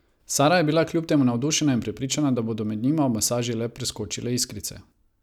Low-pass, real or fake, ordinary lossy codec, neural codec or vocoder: 19.8 kHz; real; none; none